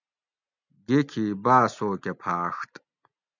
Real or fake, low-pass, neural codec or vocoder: real; 7.2 kHz; none